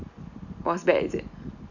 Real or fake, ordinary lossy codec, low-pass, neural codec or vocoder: real; none; 7.2 kHz; none